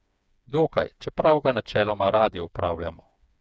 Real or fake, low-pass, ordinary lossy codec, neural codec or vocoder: fake; none; none; codec, 16 kHz, 4 kbps, FreqCodec, smaller model